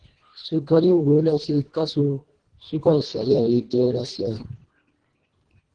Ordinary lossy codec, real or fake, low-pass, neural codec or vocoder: Opus, 16 kbps; fake; 9.9 kHz; codec, 24 kHz, 1.5 kbps, HILCodec